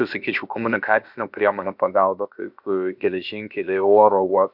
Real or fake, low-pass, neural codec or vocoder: fake; 5.4 kHz; codec, 16 kHz, about 1 kbps, DyCAST, with the encoder's durations